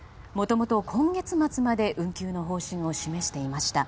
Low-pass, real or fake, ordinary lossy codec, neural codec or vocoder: none; real; none; none